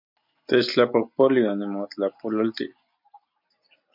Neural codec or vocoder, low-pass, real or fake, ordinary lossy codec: none; 5.4 kHz; real; MP3, 48 kbps